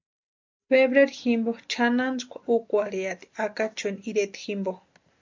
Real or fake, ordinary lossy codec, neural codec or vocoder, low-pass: real; MP3, 64 kbps; none; 7.2 kHz